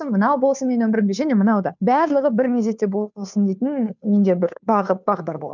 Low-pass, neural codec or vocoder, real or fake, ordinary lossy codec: 7.2 kHz; codec, 16 kHz, 2 kbps, FunCodec, trained on Chinese and English, 25 frames a second; fake; none